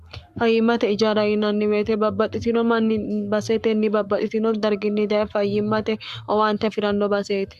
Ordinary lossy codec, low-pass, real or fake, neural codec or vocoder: Opus, 64 kbps; 14.4 kHz; fake; codec, 44.1 kHz, 7.8 kbps, Pupu-Codec